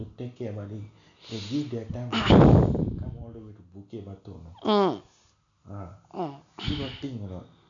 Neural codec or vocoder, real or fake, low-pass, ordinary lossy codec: none; real; 7.2 kHz; none